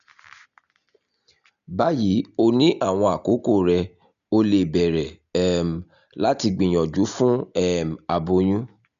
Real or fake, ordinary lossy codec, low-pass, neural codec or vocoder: real; none; 7.2 kHz; none